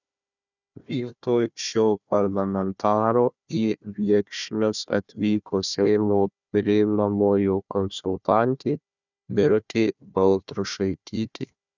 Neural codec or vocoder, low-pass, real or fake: codec, 16 kHz, 1 kbps, FunCodec, trained on Chinese and English, 50 frames a second; 7.2 kHz; fake